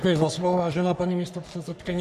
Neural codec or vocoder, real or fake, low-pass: codec, 44.1 kHz, 3.4 kbps, Pupu-Codec; fake; 14.4 kHz